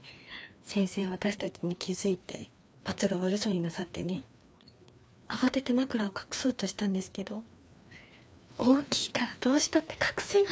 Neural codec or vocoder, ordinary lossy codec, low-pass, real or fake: codec, 16 kHz, 2 kbps, FreqCodec, larger model; none; none; fake